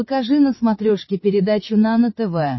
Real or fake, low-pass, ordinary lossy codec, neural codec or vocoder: fake; 7.2 kHz; MP3, 24 kbps; codec, 16 kHz, 16 kbps, FreqCodec, larger model